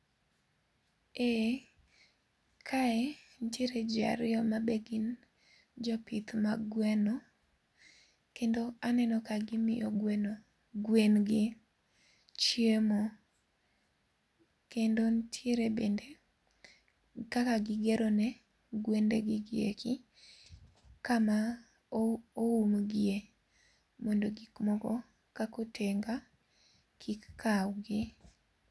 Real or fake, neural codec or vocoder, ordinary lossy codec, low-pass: real; none; none; none